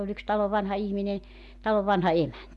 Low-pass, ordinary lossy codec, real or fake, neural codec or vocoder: none; none; real; none